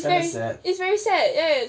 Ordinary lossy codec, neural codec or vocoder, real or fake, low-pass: none; none; real; none